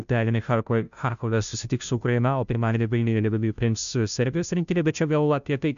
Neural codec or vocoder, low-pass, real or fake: codec, 16 kHz, 0.5 kbps, FunCodec, trained on Chinese and English, 25 frames a second; 7.2 kHz; fake